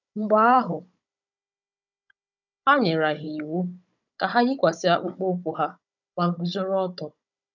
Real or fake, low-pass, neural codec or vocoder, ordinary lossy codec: fake; 7.2 kHz; codec, 16 kHz, 16 kbps, FunCodec, trained on Chinese and English, 50 frames a second; none